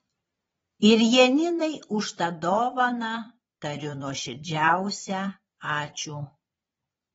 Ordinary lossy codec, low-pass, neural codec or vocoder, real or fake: AAC, 24 kbps; 9.9 kHz; vocoder, 22.05 kHz, 80 mel bands, Vocos; fake